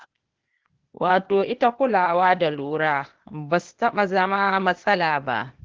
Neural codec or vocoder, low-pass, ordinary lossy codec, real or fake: codec, 16 kHz, 0.8 kbps, ZipCodec; 7.2 kHz; Opus, 16 kbps; fake